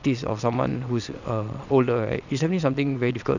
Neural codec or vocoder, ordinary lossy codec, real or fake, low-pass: vocoder, 22.05 kHz, 80 mel bands, WaveNeXt; none; fake; 7.2 kHz